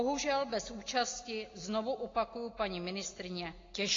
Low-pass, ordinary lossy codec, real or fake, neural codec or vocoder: 7.2 kHz; AAC, 32 kbps; real; none